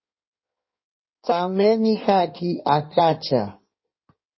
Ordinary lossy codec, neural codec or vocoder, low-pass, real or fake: MP3, 24 kbps; codec, 16 kHz in and 24 kHz out, 1.1 kbps, FireRedTTS-2 codec; 7.2 kHz; fake